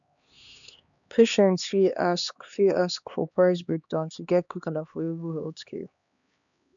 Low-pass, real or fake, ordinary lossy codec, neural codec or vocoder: 7.2 kHz; fake; none; codec, 16 kHz, 2 kbps, X-Codec, HuBERT features, trained on LibriSpeech